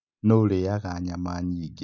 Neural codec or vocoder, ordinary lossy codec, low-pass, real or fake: none; none; 7.2 kHz; real